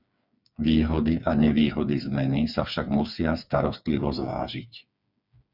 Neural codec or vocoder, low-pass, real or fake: codec, 16 kHz, 4 kbps, FreqCodec, smaller model; 5.4 kHz; fake